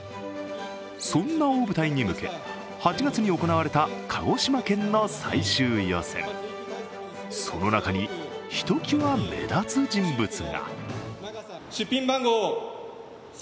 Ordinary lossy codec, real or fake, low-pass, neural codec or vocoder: none; real; none; none